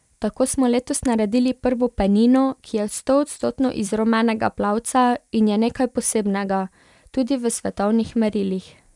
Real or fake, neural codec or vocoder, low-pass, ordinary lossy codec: fake; vocoder, 44.1 kHz, 128 mel bands every 256 samples, BigVGAN v2; 10.8 kHz; none